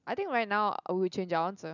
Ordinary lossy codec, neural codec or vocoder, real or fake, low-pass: none; none; real; 7.2 kHz